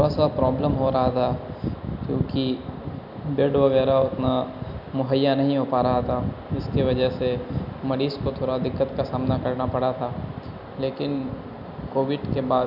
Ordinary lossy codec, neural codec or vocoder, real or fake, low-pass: none; none; real; 5.4 kHz